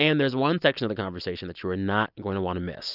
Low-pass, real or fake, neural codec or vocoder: 5.4 kHz; real; none